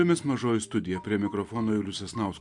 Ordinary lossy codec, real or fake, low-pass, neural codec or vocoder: MP3, 64 kbps; real; 10.8 kHz; none